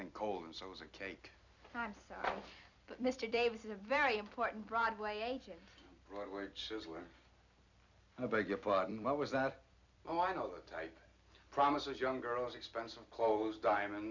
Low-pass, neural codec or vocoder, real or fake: 7.2 kHz; vocoder, 44.1 kHz, 128 mel bands every 256 samples, BigVGAN v2; fake